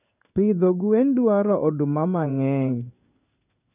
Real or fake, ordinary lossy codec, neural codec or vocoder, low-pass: fake; none; codec, 16 kHz in and 24 kHz out, 1 kbps, XY-Tokenizer; 3.6 kHz